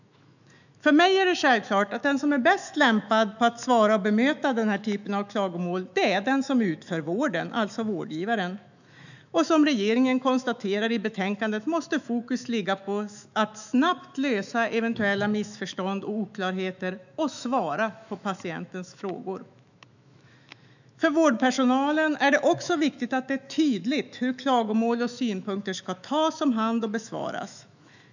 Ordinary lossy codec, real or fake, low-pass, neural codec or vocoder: none; fake; 7.2 kHz; autoencoder, 48 kHz, 128 numbers a frame, DAC-VAE, trained on Japanese speech